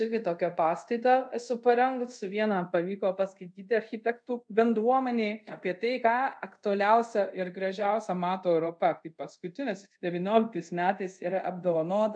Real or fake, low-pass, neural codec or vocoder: fake; 9.9 kHz; codec, 24 kHz, 0.5 kbps, DualCodec